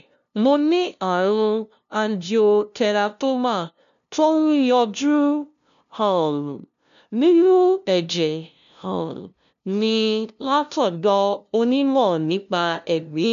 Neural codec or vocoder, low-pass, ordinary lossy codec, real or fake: codec, 16 kHz, 0.5 kbps, FunCodec, trained on LibriTTS, 25 frames a second; 7.2 kHz; none; fake